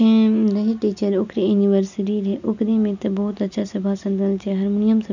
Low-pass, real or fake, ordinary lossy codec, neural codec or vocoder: 7.2 kHz; real; none; none